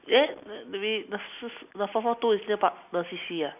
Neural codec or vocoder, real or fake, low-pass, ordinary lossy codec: none; real; 3.6 kHz; none